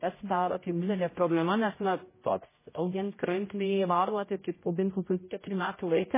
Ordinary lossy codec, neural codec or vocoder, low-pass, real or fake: MP3, 16 kbps; codec, 16 kHz, 0.5 kbps, X-Codec, HuBERT features, trained on general audio; 3.6 kHz; fake